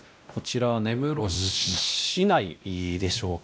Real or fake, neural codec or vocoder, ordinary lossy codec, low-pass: fake; codec, 16 kHz, 1 kbps, X-Codec, WavLM features, trained on Multilingual LibriSpeech; none; none